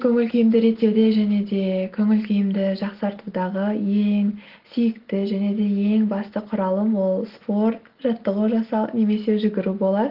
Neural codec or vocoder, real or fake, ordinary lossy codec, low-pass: none; real; Opus, 16 kbps; 5.4 kHz